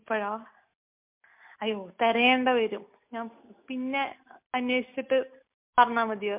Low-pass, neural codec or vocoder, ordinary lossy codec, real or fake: 3.6 kHz; none; MP3, 32 kbps; real